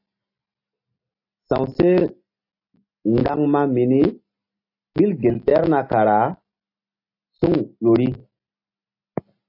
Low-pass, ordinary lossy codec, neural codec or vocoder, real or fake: 5.4 kHz; AAC, 32 kbps; none; real